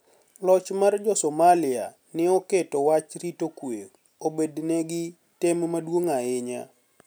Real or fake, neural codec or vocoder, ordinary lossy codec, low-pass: real; none; none; none